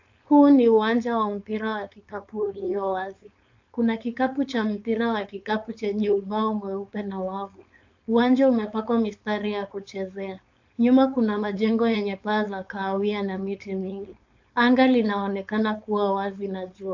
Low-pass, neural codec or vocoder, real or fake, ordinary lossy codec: 7.2 kHz; codec, 16 kHz, 4.8 kbps, FACodec; fake; AAC, 48 kbps